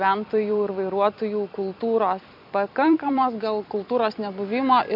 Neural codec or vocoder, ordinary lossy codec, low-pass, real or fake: vocoder, 44.1 kHz, 128 mel bands every 256 samples, BigVGAN v2; MP3, 48 kbps; 5.4 kHz; fake